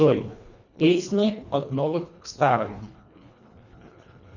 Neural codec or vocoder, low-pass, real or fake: codec, 24 kHz, 1.5 kbps, HILCodec; 7.2 kHz; fake